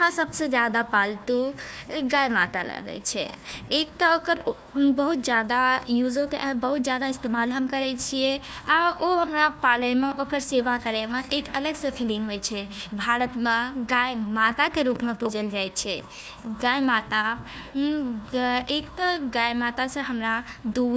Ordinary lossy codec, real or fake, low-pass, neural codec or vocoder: none; fake; none; codec, 16 kHz, 1 kbps, FunCodec, trained on Chinese and English, 50 frames a second